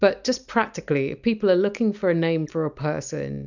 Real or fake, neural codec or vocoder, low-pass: real; none; 7.2 kHz